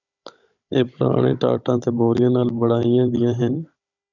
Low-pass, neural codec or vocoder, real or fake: 7.2 kHz; codec, 16 kHz, 16 kbps, FunCodec, trained on Chinese and English, 50 frames a second; fake